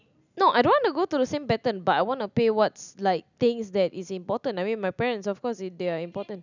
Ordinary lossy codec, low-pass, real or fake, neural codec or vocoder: none; 7.2 kHz; real; none